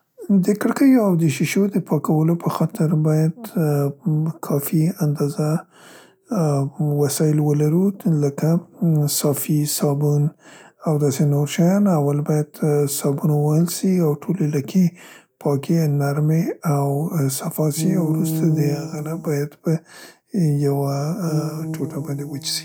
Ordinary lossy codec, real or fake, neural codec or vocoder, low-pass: none; real; none; none